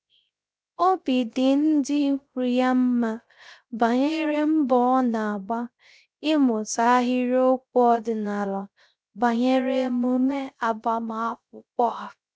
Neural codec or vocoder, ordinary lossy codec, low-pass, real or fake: codec, 16 kHz, 0.3 kbps, FocalCodec; none; none; fake